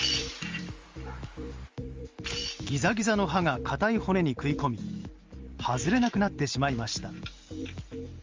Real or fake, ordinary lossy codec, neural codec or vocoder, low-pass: fake; Opus, 32 kbps; vocoder, 44.1 kHz, 128 mel bands every 512 samples, BigVGAN v2; 7.2 kHz